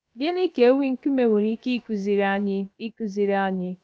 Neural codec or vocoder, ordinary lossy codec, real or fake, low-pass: codec, 16 kHz, about 1 kbps, DyCAST, with the encoder's durations; none; fake; none